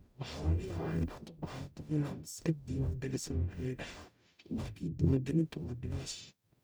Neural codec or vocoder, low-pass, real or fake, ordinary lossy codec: codec, 44.1 kHz, 0.9 kbps, DAC; none; fake; none